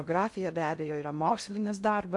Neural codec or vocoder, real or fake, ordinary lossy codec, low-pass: codec, 16 kHz in and 24 kHz out, 0.6 kbps, FocalCodec, streaming, 2048 codes; fake; MP3, 64 kbps; 10.8 kHz